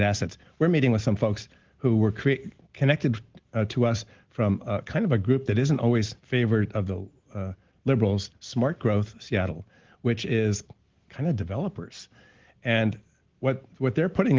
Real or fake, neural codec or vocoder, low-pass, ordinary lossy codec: real; none; 7.2 kHz; Opus, 16 kbps